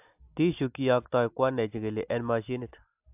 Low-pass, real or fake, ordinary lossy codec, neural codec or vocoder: 3.6 kHz; real; AAC, 32 kbps; none